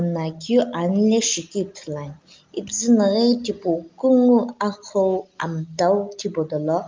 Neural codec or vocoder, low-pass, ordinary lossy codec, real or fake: none; 7.2 kHz; Opus, 24 kbps; real